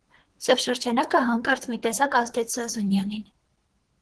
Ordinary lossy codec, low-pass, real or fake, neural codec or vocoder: Opus, 16 kbps; 10.8 kHz; fake; codec, 24 kHz, 3 kbps, HILCodec